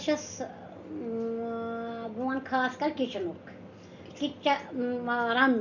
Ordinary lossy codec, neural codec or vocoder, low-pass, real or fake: none; none; 7.2 kHz; real